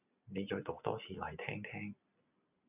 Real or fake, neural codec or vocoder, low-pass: real; none; 3.6 kHz